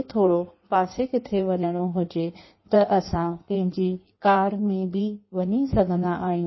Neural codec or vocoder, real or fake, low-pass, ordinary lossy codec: codec, 16 kHz in and 24 kHz out, 1.1 kbps, FireRedTTS-2 codec; fake; 7.2 kHz; MP3, 24 kbps